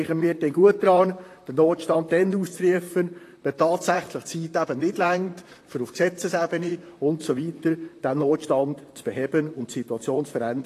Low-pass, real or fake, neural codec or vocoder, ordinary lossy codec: 14.4 kHz; fake; vocoder, 44.1 kHz, 128 mel bands, Pupu-Vocoder; AAC, 48 kbps